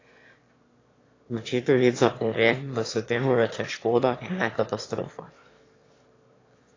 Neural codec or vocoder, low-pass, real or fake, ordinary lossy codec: autoencoder, 22.05 kHz, a latent of 192 numbers a frame, VITS, trained on one speaker; 7.2 kHz; fake; AAC, 32 kbps